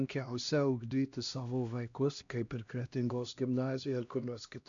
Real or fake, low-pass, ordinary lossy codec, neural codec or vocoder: fake; 7.2 kHz; MP3, 64 kbps; codec, 16 kHz, 1 kbps, X-Codec, HuBERT features, trained on LibriSpeech